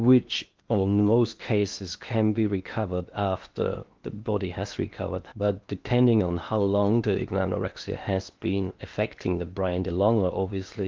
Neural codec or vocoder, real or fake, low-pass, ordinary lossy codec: codec, 16 kHz in and 24 kHz out, 0.8 kbps, FocalCodec, streaming, 65536 codes; fake; 7.2 kHz; Opus, 32 kbps